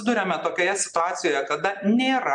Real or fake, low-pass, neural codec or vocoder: real; 9.9 kHz; none